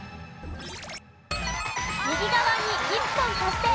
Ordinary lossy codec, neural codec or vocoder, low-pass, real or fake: none; none; none; real